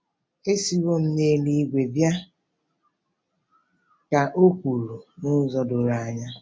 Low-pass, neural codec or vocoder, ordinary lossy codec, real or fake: none; none; none; real